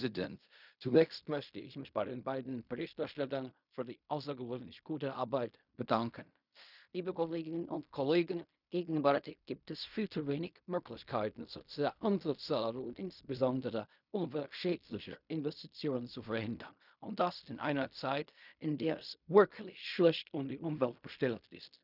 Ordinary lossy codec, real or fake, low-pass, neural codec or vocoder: none; fake; 5.4 kHz; codec, 16 kHz in and 24 kHz out, 0.4 kbps, LongCat-Audio-Codec, fine tuned four codebook decoder